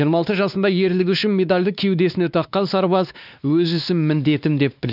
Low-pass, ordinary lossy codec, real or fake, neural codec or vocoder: 5.4 kHz; none; fake; codec, 16 kHz, 2 kbps, X-Codec, WavLM features, trained on Multilingual LibriSpeech